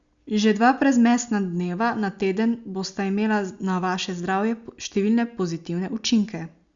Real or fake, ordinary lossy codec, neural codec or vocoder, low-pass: real; Opus, 64 kbps; none; 7.2 kHz